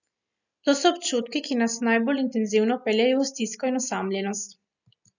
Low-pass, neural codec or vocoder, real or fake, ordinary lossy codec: 7.2 kHz; none; real; none